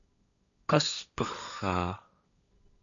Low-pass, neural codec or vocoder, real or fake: 7.2 kHz; codec, 16 kHz, 1.1 kbps, Voila-Tokenizer; fake